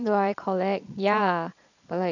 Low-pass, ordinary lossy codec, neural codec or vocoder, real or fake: 7.2 kHz; none; vocoder, 22.05 kHz, 80 mel bands, Vocos; fake